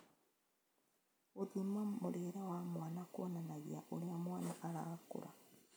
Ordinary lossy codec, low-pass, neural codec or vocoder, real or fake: none; none; none; real